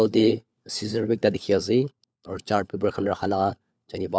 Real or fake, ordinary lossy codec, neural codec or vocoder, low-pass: fake; none; codec, 16 kHz, 4 kbps, FunCodec, trained on LibriTTS, 50 frames a second; none